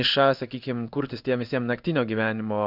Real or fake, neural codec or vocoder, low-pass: fake; codec, 16 kHz in and 24 kHz out, 1 kbps, XY-Tokenizer; 5.4 kHz